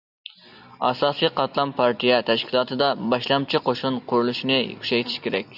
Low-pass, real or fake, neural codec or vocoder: 5.4 kHz; real; none